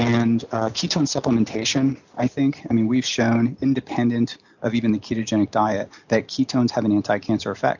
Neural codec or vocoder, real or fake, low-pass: none; real; 7.2 kHz